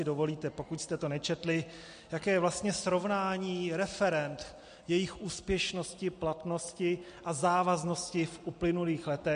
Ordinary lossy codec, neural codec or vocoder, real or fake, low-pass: MP3, 48 kbps; none; real; 9.9 kHz